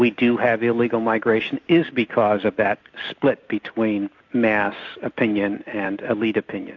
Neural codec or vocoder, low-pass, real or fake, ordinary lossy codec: none; 7.2 kHz; real; AAC, 48 kbps